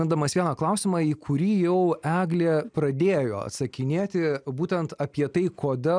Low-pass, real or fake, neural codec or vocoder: 9.9 kHz; real; none